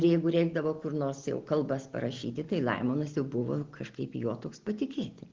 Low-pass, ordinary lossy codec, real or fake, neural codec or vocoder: 7.2 kHz; Opus, 16 kbps; real; none